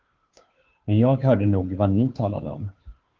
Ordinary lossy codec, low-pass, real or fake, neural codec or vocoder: Opus, 16 kbps; 7.2 kHz; fake; codec, 16 kHz, 2 kbps, FunCodec, trained on Chinese and English, 25 frames a second